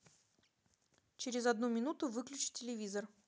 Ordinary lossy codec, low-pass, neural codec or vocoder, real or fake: none; none; none; real